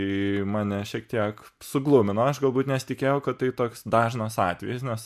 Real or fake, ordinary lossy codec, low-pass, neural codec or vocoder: real; MP3, 96 kbps; 14.4 kHz; none